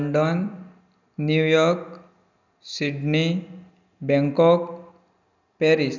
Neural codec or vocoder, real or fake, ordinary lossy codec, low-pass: none; real; none; 7.2 kHz